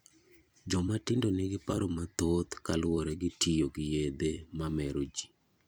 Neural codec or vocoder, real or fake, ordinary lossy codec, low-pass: vocoder, 44.1 kHz, 128 mel bands every 256 samples, BigVGAN v2; fake; none; none